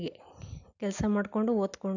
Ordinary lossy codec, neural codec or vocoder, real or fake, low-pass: none; none; real; 7.2 kHz